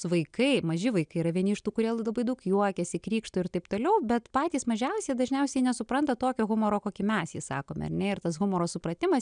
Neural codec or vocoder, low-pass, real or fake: none; 9.9 kHz; real